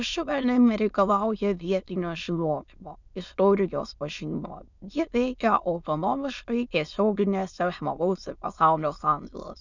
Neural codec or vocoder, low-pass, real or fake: autoencoder, 22.05 kHz, a latent of 192 numbers a frame, VITS, trained on many speakers; 7.2 kHz; fake